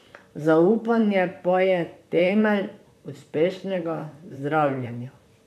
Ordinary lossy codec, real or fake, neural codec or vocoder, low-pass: none; fake; vocoder, 44.1 kHz, 128 mel bands, Pupu-Vocoder; 14.4 kHz